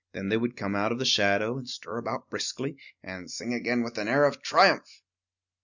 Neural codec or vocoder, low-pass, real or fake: none; 7.2 kHz; real